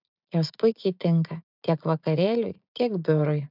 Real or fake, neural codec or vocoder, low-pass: fake; vocoder, 44.1 kHz, 80 mel bands, Vocos; 5.4 kHz